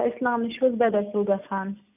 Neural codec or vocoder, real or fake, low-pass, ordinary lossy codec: none; real; 3.6 kHz; none